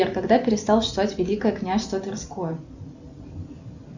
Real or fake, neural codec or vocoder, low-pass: real; none; 7.2 kHz